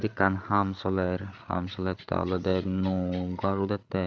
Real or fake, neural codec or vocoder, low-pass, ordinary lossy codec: fake; codec, 16 kHz, 8 kbps, FreqCodec, larger model; none; none